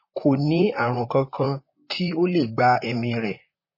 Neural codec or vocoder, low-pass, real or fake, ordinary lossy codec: vocoder, 44.1 kHz, 128 mel bands, Pupu-Vocoder; 5.4 kHz; fake; MP3, 24 kbps